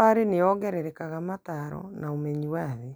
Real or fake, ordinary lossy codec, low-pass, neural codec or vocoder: real; none; none; none